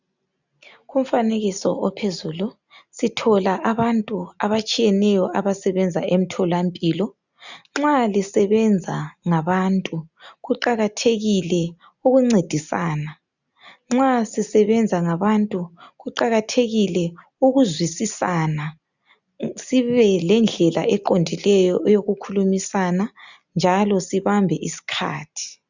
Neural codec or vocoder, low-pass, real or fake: none; 7.2 kHz; real